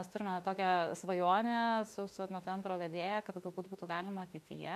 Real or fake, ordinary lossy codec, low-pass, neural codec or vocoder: fake; MP3, 64 kbps; 14.4 kHz; autoencoder, 48 kHz, 32 numbers a frame, DAC-VAE, trained on Japanese speech